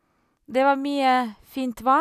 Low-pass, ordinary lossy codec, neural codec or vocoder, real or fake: 14.4 kHz; none; none; real